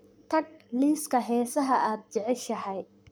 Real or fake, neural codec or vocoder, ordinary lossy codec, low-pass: fake; codec, 44.1 kHz, 7.8 kbps, Pupu-Codec; none; none